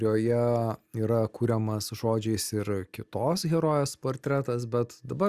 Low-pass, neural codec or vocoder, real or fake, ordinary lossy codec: 14.4 kHz; none; real; Opus, 64 kbps